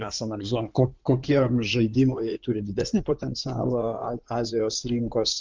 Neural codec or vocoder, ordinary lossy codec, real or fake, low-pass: codec, 16 kHz in and 24 kHz out, 2.2 kbps, FireRedTTS-2 codec; Opus, 32 kbps; fake; 7.2 kHz